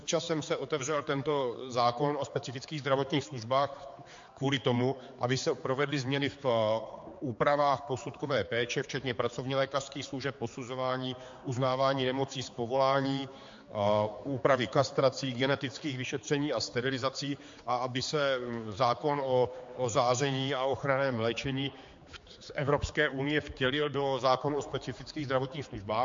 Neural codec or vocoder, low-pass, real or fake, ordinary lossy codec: codec, 16 kHz, 4 kbps, X-Codec, HuBERT features, trained on general audio; 7.2 kHz; fake; MP3, 48 kbps